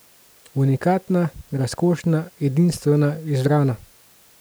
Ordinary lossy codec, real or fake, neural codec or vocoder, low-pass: none; real; none; none